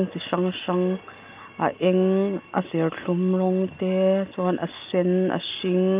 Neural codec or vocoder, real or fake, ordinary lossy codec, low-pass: none; real; Opus, 24 kbps; 3.6 kHz